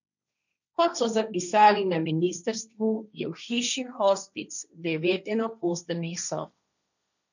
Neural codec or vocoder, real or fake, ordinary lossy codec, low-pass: codec, 16 kHz, 1.1 kbps, Voila-Tokenizer; fake; none; 7.2 kHz